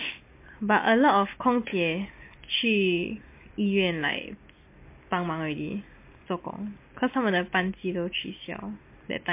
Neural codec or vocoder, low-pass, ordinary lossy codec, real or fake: none; 3.6 kHz; MP3, 24 kbps; real